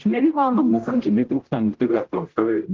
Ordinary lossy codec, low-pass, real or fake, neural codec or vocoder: Opus, 16 kbps; 7.2 kHz; fake; codec, 16 kHz, 0.5 kbps, X-Codec, HuBERT features, trained on general audio